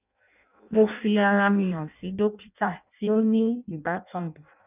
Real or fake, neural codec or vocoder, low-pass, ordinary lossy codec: fake; codec, 16 kHz in and 24 kHz out, 0.6 kbps, FireRedTTS-2 codec; 3.6 kHz; none